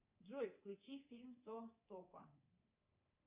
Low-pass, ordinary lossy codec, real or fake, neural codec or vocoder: 3.6 kHz; Opus, 64 kbps; fake; codec, 24 kHz, 3.1 kbps, DualCodec